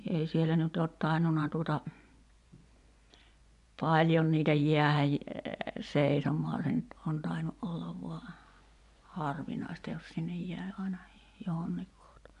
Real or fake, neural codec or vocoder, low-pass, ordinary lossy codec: fake; vocoder, 24 kHz, 100 mel bands, Vocos; 10.8 kHz; none